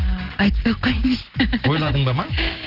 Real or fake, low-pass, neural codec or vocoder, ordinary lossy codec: real; 5.4 kHz; none; Opus, 16 kbps